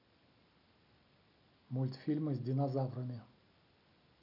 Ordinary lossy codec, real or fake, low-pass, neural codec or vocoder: none; real; 5.4 kHz; none